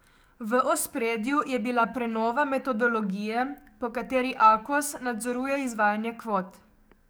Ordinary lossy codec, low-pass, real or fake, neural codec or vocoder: none; none; fake; codec, 44.1 kHz, 7.8 kbps, DAC